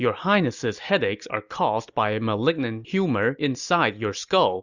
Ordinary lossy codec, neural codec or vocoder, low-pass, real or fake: Opus, 64 kbps; none; 7.2 kHz; real